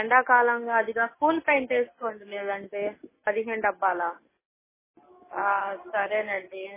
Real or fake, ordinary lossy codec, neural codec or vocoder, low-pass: real; MP3, 16 kbps; none; 3.6 kHz